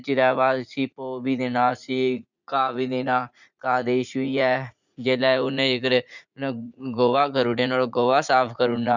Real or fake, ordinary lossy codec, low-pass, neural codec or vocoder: fake; none; 7.2 kHz; vocoder, 44.1 kHz, 80 mel bands, Vocos